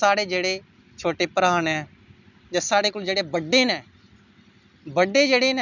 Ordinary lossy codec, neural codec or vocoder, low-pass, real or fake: none; none; 7.2 kHz; real